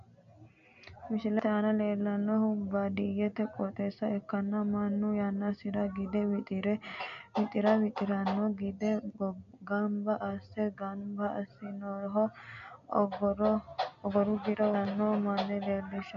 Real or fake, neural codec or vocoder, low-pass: real; none; 7.2 kHz